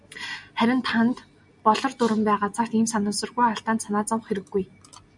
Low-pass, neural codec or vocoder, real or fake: 10.8 kHz; none; real